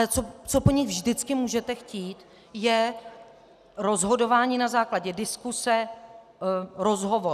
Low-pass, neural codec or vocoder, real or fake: 14.4 kHz; none; real